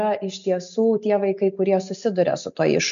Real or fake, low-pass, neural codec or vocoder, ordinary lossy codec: real; 7.2 kHz; none; MP3, 64 kbps